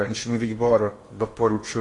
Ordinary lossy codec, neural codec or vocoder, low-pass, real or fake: AAC, 32 kbps; codec, 16 kHz in and 24 kHz out, 0.8 kbps, FocalCodec, streaming, 65536 codes; 10.8 kHz; fake